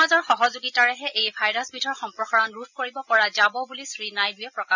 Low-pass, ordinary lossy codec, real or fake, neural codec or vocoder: 7.2 kHz; none; real; none